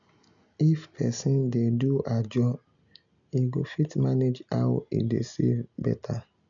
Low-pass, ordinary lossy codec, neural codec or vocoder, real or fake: 7.2 kHz; none; none; real